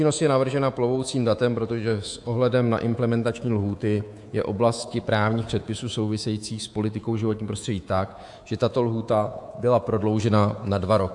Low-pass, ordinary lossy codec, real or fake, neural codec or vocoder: 10.8 kHz; AAC, 64 kbps; fake; codec, 24 kHz, 3.1 kbps, DualCodec